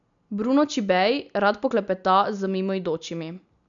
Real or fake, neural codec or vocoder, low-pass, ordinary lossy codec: real; none; 7.2 kHz; none